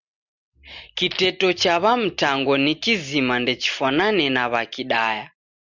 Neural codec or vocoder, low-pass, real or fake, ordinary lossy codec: none; 7.2 kHz; real; Opus, 64 kbps